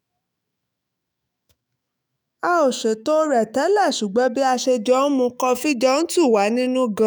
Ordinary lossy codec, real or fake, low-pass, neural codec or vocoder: none; fake; none; autoencoder, 48 kHz, 128 numbers a frame, DAC-VAE, trained on Japanese speech